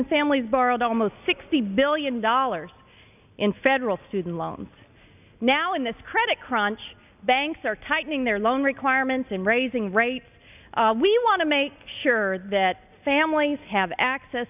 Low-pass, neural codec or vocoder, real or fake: 3.6 kHz; none; real